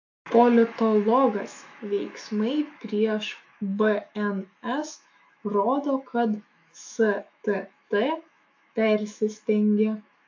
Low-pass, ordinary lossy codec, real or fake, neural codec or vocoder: 7.2 kHz; AAC, 48 kbps; fake; autoencoder, 48 kHz, 128 numbers a frame, DAC-VAE, trained on Japanese speech